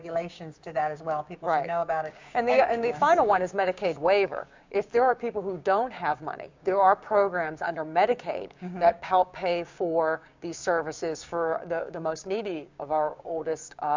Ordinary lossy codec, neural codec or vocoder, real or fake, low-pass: MP3, 64 kbps; codec, 16 kHz, 6 kbps, DAC; fake; 7.2 kHz